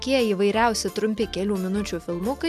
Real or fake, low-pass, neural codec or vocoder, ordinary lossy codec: real; 14.4 kHz; none; MP3, 96 kbps